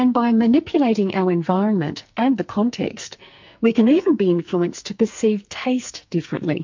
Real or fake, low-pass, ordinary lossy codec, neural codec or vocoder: fake; 7.2 kHz; MP3, 48 kbps; codec, 44.1 kHz, 2.6 kbps, SNAC